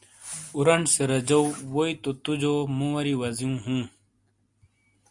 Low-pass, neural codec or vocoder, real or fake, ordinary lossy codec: 10.8 kHz; none; real; Opus, 64 kbps